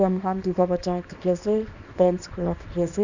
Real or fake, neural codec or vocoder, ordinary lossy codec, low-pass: fake; codec, 24 kHz, 0.9 kbps, WavTokenizer, small release; none; 7.2 kHz